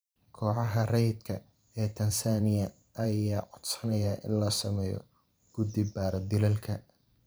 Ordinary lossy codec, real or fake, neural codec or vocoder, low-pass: none; fake; vocoder, 44.1 kHz, 128 mel bands every 512 samples, BigVGAN v2; none